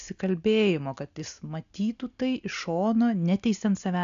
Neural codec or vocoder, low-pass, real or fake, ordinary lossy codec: none; 7.2 kHz; real; AAC, 96 kbps